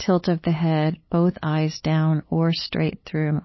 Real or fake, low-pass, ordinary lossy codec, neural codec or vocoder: fake; 7.2 kHz; MP3, 24 kbps; codec, 16 kHz, 8 kbps, FunCodec, trained on LibriTTS, 25 frames a second